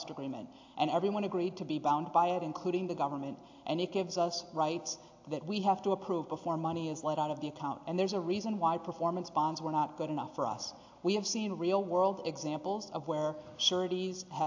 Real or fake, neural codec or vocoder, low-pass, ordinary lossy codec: real; none; 7.2 kHz; AAC, 48 kbps